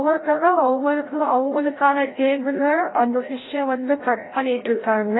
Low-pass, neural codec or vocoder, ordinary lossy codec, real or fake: 7.2 kHz; codec, 16 kHz, 0.5 kbps, FreqCodec, larger model; AAC, 16 kbps; fake